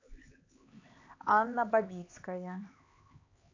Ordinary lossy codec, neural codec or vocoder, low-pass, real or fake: AAC, 32 kbps; codec, 16 kHz, 4 kbps, X-Codec, HuBERT features, trained on LibriSpeech; 7.2 kHz; fake